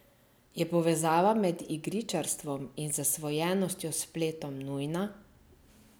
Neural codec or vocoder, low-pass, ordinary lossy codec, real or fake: none; none; none; real